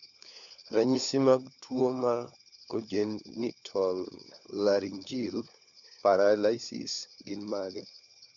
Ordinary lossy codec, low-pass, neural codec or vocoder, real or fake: none; 7.2 kHz; codec, 16 kHz, 4 kbps, FunCodec, trained on LibriTTS, 50 frames a second; fake